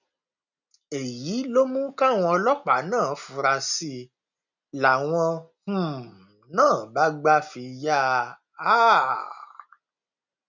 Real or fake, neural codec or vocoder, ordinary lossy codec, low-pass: real; none; none; 7.2 kHz